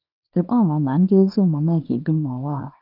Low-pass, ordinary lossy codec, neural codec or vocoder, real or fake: 5.4 kHz; none; codec, 24 kHz, 0.9 kbps, WavTokenizer, small release; fake